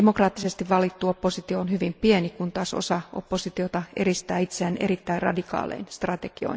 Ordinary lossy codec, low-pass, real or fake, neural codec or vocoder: none; none; real; none